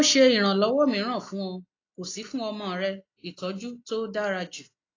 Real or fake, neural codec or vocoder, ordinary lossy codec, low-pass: real; none; AAC, 32 kbps; 7.2 kHz